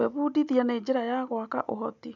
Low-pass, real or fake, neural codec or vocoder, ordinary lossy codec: 7.2 kHz; real; none; none